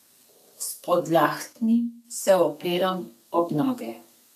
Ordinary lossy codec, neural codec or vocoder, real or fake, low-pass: MP3, 96 kbps; codec, 32 kHz, 1.9 kbps, SNAC; fake; 14.4 kHz